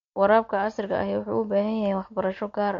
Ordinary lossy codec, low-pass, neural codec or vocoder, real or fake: MP3, 48 kbps; 7.2 kHz; none; real